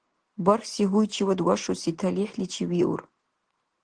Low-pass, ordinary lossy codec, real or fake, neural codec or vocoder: 9.9 kHz; Opus, 16 kbps; real; none